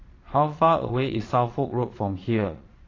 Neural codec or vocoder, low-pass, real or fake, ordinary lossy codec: vocoder, 22.05 kHz, 80 mel bands, Vocos; 7.2 kHz; fake; AAC, 32 kbps